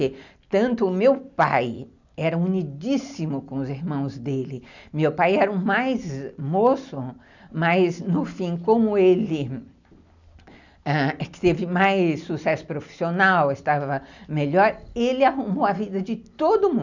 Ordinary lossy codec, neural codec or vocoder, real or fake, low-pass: none; none; real; 7.2 kHz